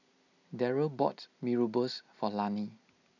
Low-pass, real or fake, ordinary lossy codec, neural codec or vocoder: 7.2 kHz; real; none; none